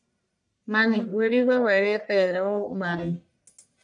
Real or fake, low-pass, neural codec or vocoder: fake; 10.8 kHz; codec, 44.1 kHz, 1.7 kbps, Pupu-Codec